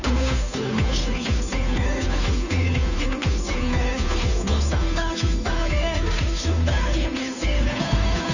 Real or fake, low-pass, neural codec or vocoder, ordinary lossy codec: fake; 7.2 kHz; codec, 16 kHz, 2 kbps, FunCodec, trained on Chinese and English, 25 frames a second; none